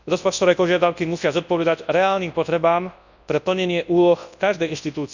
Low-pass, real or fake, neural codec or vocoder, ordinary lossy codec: 7.2 kHz; fake; codec, 24 kHz, 0.9 kbps, WavTokenizer, large speech release; none